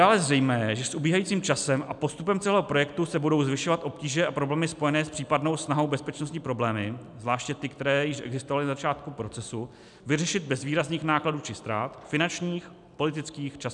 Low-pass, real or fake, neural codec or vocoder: 10.8 kHz; real; none